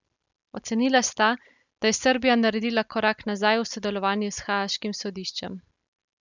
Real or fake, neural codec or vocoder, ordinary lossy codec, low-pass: real; none; none; 7.2 kHz